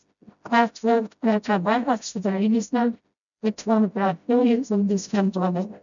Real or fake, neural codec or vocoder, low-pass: fake; codec, 16 kHz, 0.5 kbps, FreqCodec, smaller model; 7.2 kHz